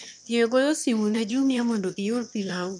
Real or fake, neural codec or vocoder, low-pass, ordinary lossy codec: fake; autoencoder, 22.05 kHz, a latent of 192 numbers a frame, VITS, trained on one speaker; 9.9 kHz; none